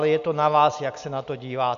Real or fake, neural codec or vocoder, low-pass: real; none; 7.2 kHz